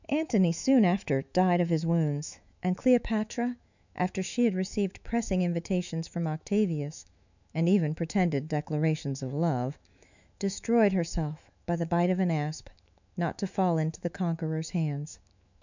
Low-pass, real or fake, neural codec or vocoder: 7.2 kHz; fake; autoencoder, 48 kHz, 128 numbers a frame, DAC-VAE, trained on Japanese speech